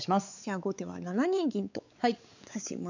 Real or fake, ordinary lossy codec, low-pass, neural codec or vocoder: fake; none; 7.2 kHz; codec, 16 kHz, 4 kbps, X-Codec, WavLM features, trained on Multilingual LibriSpeech